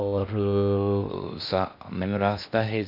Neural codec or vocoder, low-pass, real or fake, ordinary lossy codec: codec, 16 kHz in and 24 kHz out, 0.6 kbps, FocalCodec, streaming, 4096 codes; 5.4 kHz; fake; none